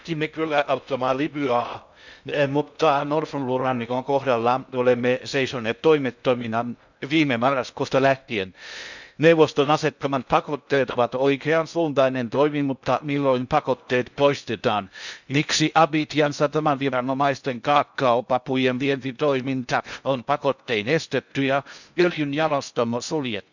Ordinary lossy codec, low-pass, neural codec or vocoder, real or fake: none; 7.2 kHz; codec, 16 kHz in and 24 kHz out, 0.6 kbps, FocalCodec, streaming, 2048 codes; fake